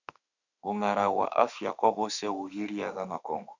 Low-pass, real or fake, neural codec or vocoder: 7.2 kHz; fake; autoencoder, 48 kHz, 32 numbers a frame, DAC-VAE, trained on Japanese speech